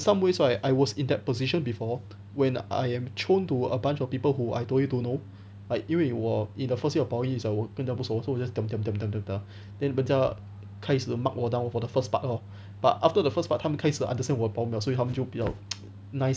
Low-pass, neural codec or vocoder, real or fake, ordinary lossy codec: none; none; real; none